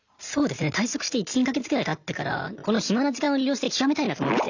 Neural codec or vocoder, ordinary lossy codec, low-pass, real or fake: none; none; 7.2 kHz; real